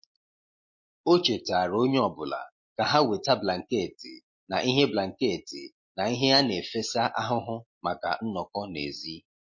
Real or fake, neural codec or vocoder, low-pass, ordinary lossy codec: real; none; 7.2 kHz; MP3, 32 kbps